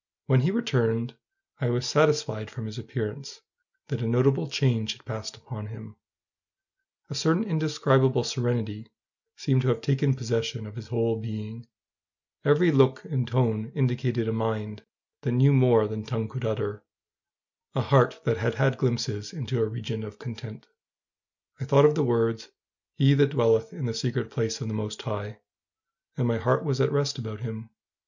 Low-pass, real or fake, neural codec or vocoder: 7.2 kHz; real; none